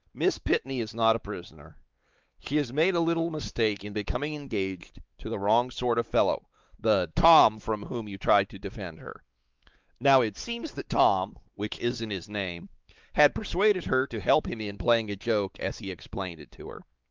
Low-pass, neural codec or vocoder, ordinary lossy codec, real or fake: 7.2 kHz; codec, 16 kHz, 4 kbps, X-Codec, WavLM features, trained on Multilingual LibriSpeech; Opus, 32 kbps; fake